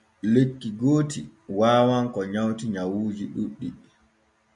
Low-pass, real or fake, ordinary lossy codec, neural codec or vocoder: 10.8 kHz; real; MP3, 96 kbps; none